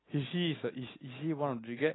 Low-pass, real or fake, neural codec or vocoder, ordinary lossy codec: 7.2 kHz; real; none; AAC, 16 kbps